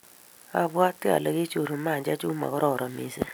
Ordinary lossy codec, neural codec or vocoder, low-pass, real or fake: none; none; none; real